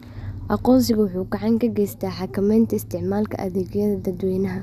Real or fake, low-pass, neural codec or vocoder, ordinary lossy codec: real; 14.4 kHz; none; none